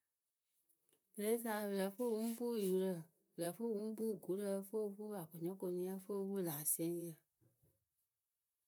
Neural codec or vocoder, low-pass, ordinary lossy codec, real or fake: vocoder, 44.1 kHz, 128 mel bands, Pupu-Vocoder; none; none; fake